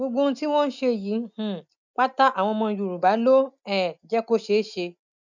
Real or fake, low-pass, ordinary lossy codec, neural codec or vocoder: real; 7.2 kHz; none; none